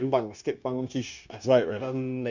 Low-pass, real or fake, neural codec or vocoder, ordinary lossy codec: 7.2 kHz; fake; codec, 16 kHz, 0.9 kbps, LongCat-Audio-Codec; none